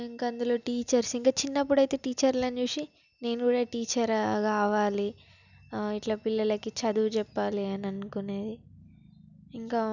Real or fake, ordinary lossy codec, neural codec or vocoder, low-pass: real; none; none; 7.2 kHz